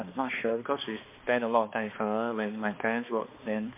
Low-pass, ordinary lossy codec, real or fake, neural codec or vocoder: 3.6 kHz; MP3, 24 kbps; fake; codec, 16 kHz, 2 kbps, X-Codec, HuBERT features, trained on balanced general audio